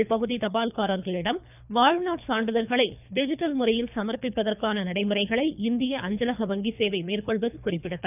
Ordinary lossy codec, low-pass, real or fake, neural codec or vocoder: none; 3.6 kHz; fake; codec, 24 kHz, 3 kbps, HILCodec